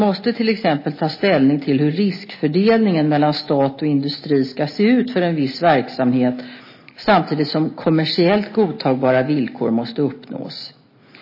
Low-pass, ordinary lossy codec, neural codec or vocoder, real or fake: 5.4 kHz; MP3, 24 kbps; none; real